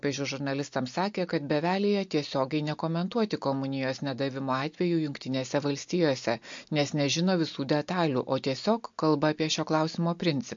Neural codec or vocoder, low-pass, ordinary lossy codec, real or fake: none; 7.2 kHz; MP3, 48 kbps; real